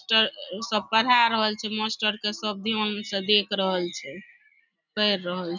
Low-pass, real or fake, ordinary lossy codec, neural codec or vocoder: 7.2 kHz; real; none; none